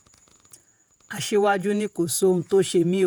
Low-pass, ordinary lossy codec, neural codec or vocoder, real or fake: none; none; vocoder, 48 kHz, 128 mel bands, Vocos; fake